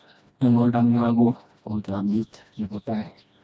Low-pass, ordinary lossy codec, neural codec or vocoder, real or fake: none; none; codec, 16 kHz, 1 kbps, FreqCodec, smaller model; fake